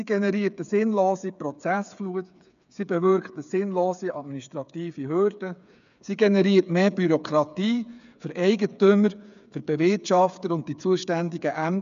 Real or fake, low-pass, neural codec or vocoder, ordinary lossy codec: fake; 7.2 kHz; codec, 16 kHz, 8 kbps, FreqCodec, smaller model; none